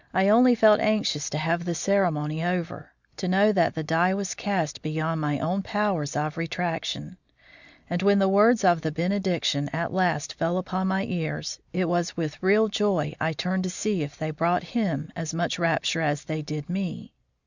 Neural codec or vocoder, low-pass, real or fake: none; 7.2 kHz; real